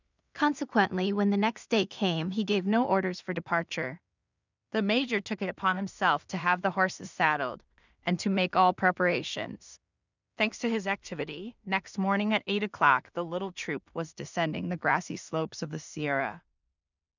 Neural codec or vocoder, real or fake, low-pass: codec, 16 kHz in and 24 kHz out, 0.4 kbps, LongCat-Audio-Codec, two codebook decoder; fake; 7.2 kHz